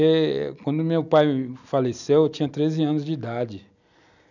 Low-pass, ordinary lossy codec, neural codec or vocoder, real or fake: 7.2 kHz; none; none; real